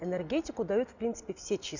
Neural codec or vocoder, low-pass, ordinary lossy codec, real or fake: none; 7.2 kHz; none; real